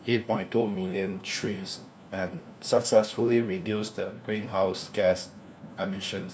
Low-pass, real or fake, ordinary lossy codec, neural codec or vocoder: none; fake; none; codec, 16 kHz, 1 kbps, FunCodec, trained on LibriTTS, 50 frames a second